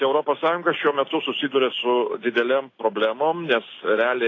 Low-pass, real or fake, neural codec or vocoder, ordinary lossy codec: 7.2 kHz; real; none; AAC, 32 kbps